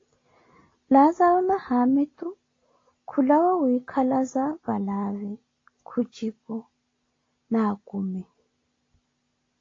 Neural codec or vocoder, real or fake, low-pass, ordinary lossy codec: none; real; 7.2 kHz; AAC, 32 kbps